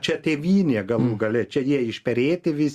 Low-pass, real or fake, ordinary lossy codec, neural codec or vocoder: 14.4 kHz; real; Opus, 64 kbps; none